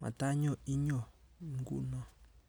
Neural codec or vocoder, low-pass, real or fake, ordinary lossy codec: none; none; real; none